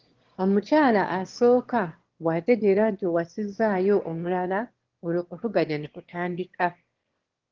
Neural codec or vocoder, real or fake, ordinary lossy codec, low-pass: autoencoder, 22.05 kHz, a latent of 192 numbers a frame, VITS, trained on one speaker; fake; Opus, 16 kbps; 7.2 kHz